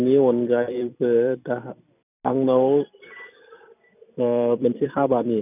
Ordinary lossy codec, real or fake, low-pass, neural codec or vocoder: none; fake; 3.6 kHz; vocoder, 44.1 kHz, 128 mel bands every 256 samples, BigVGAN v2